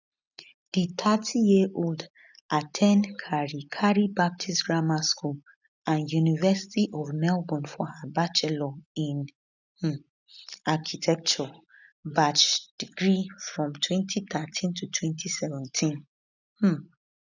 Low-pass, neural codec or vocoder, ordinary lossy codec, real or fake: 7.2 kHz; none; none; real